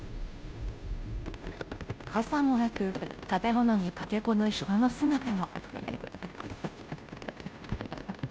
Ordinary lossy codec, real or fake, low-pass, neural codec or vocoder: none; fake; none; codec, 16 kHz, 0.5 kbps, FunCodec, trained on Chinese and English, 25 frames a second